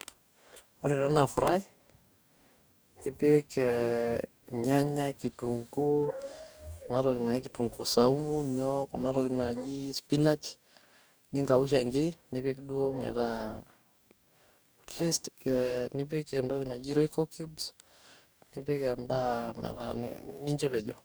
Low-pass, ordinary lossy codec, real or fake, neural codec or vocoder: none; none; fake; codec, 44.1 kHz, 2.6 kbps, DAC